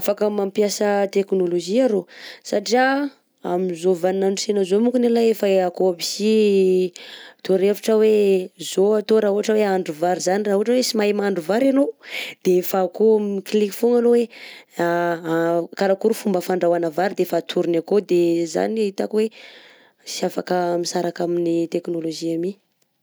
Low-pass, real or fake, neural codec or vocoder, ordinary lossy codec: none; real; none; none